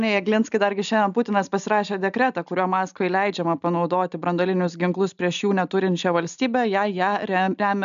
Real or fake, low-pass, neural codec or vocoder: real; 7.2 kHz; none